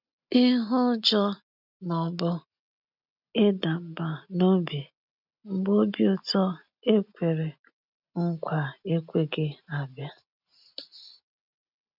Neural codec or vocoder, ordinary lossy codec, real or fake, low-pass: none; none; real; 5.4 kHz